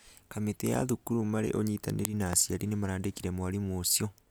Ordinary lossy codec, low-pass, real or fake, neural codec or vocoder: none; none; real; none